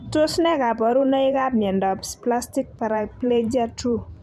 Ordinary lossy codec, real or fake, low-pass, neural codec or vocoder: MP3, 96 kbps; fake; 14.4 kHz; vocoder, 44.1 kHz, 128 mel bands every 512 samples, BigVGAN v2